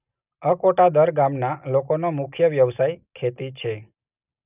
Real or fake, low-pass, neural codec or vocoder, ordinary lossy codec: real; 3.6 kHz; none; none